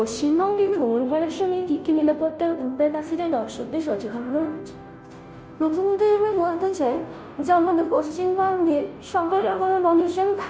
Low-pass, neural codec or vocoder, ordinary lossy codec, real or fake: none; codec, 16 kHz, 0.5 kbps, FunCodec, trained on Chinese and English, 25 frames a second; none; fake